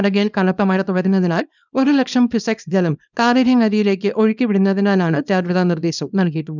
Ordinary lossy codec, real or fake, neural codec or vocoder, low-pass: none; fake; codec, 24 kHz, 0.9 kbps, WavTokenizer, small release; 7.2 kHz